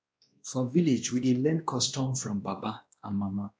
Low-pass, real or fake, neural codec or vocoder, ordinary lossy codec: none; fake; codec, 16 kHz, 1 kbps, X-Codec, WavLM features, trained on Multilingual LibriSpeech; none